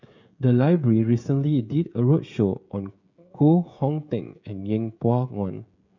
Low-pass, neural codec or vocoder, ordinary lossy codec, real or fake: 7.2 kHz; codec, 16 kHz, 16 kbps, FreqCodec, smaller model; none; fake